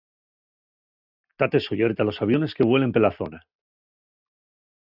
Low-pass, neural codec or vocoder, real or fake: 5.4 kHz; none; real